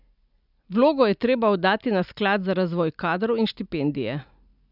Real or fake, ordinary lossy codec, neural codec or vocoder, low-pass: real; none; none; 5.4 kHz